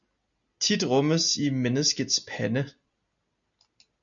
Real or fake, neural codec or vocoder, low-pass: real; none; 7.2 kHz